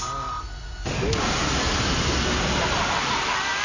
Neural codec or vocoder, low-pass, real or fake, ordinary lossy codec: none; 7.2 kHz; real; none